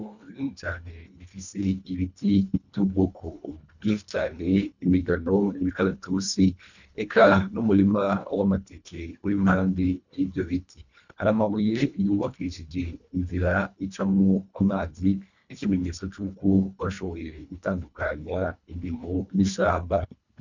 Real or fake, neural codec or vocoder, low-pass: fake; codec, 24 kHz, 1.5 kbps, HILCodec; 7.2 kHz